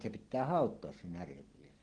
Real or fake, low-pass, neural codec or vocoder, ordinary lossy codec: real; 9.9 kHz; none; Opus, 16 kbps